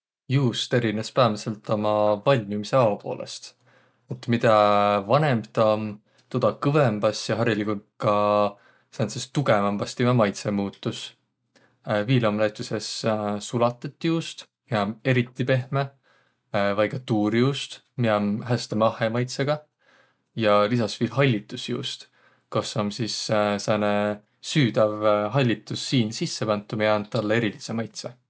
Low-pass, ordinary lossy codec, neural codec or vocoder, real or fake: none; none; none; real